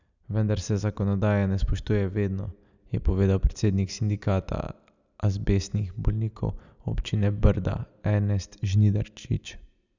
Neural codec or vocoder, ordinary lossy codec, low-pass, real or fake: none; none; 7.2 kHz; real